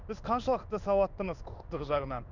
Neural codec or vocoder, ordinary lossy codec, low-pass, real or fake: codec, 16 kHz in and 24 kHz out, 1 kbps, XY-Tokenizer; none; 7.2 kHz; fake